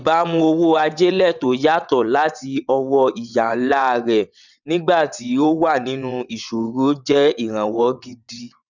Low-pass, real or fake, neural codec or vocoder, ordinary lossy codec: 7.2 kHz; fake; vocoder, 22.05 kHz, 80 mel bands, WaveNeXt; none